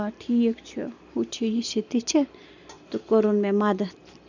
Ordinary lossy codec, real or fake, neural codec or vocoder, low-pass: none; fake; vocoder, 44.1 kHz, 128 mel bands every 256 samples, BigVGAN v2; 7.2 kHz